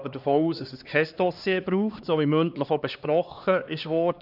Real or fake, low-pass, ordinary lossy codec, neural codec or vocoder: fake; 5.4 kHz; none; codec, 16 kHz, 2 kbps, X-Codec, HuBERT features, trained on LibriSpeech